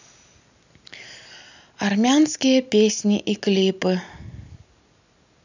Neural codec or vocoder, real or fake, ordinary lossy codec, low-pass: none; real; none; 7.2 kHz